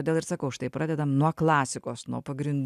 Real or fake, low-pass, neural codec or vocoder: real; 14.4 kHz; none